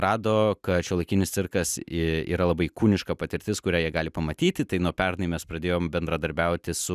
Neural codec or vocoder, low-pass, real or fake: none; 14.4 kHz; real